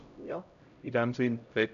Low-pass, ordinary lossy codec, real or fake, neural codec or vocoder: 7.2 kHz; none; fake; codec, 16 kHz, 0.5 kbps, X-Codec, HuBERT features, trained on LibriSpeech